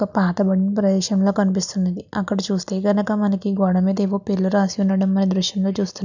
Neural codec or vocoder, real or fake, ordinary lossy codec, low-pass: none; real; none; 7.2 kHz